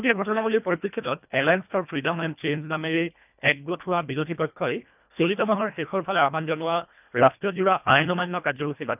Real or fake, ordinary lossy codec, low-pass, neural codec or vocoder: fake; none; 3.6 kHz; codec, 24 kHz, 1.5 kbps, HILCodec